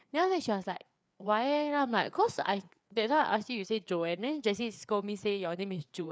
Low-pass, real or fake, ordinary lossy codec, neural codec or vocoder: none; fake; none; codec, 16 kHz, 4 kbps, FreqCodec, larger model